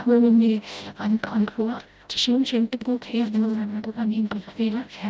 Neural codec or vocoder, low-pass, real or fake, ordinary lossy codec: codec, 16 kHz, 0.5 kbps, FreqCodec, smaller model; none; fake; none